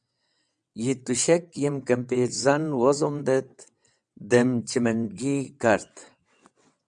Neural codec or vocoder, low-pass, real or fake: vocoder, 22.05 kHz, 80 mel bands, WaveNeXt; 9.9 kHz; fake